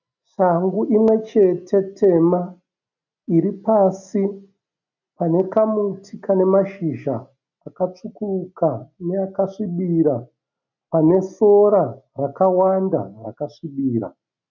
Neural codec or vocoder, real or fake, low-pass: none; real; 7.2 kHz